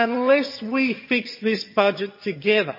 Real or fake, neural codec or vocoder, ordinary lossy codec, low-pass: fake; vocoder, 22.05 kHz, 80 mel bands, HiFi-GAN; MP3, 24 kbps; 5.4 kHz